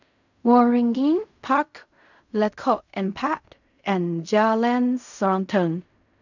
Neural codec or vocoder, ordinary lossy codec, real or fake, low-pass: codec, 16 kHz in and 24 kHz out, 0.4 kbps, LongCat-Audio-Codec, fine tuned four codebook decoder; none; fake; 7.2 kHz